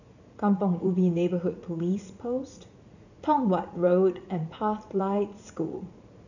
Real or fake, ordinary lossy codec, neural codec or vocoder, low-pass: fake; none; vocoder, 44.1 kHz, 80 mel bands, Vocos; 7.2 kHz